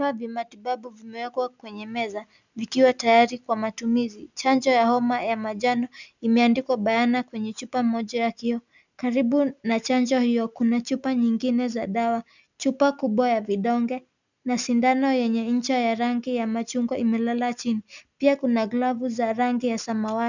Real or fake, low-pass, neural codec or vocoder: real; 7.2 kHz; none